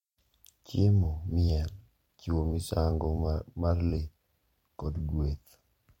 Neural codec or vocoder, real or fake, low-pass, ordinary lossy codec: vocoder, 44.1 kHz, 128 mel bands every 256 samples, BigVGAN v2; fake; 19.8 kHz; MP3, 64 kbps